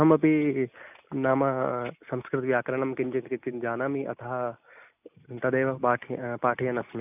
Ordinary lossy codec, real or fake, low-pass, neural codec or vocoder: none; real; 3.6 kHz; none